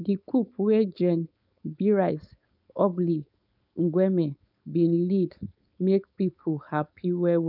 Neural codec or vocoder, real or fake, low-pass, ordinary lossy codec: codec, 16 kHz, 4.8 kbps, FACodec; fake; 5.4 kHz; none